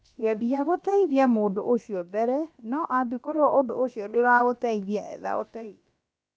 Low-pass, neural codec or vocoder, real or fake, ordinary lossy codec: none; codec, 16 kHz, about 1 kbps, DyCAST, with the encoder's durations; fake; none